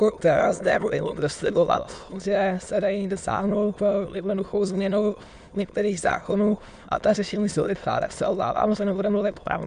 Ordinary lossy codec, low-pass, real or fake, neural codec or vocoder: MP3, 64 kbps; 9.9 kHz; fake; autoencoder, 22.05 kHz, a latent of 192 numbers a frame, VITS, trained on many speakers